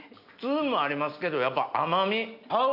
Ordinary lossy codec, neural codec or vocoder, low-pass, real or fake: AAC, 48 kbps; none; 5.4 kHz; real